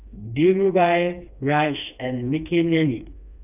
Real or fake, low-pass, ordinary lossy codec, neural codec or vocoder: fake; 3.6 kHz; none; codec, 16 kHz, 2 kbps, FreqCodec, smaller model